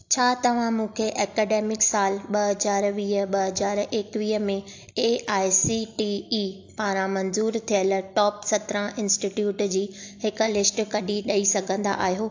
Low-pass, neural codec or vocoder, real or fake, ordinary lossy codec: 7.2 kHz; none; real; AAC, 48 kbps